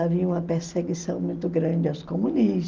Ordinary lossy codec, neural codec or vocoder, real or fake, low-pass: Opus, 24 kbps; none; real; 7.2 kHz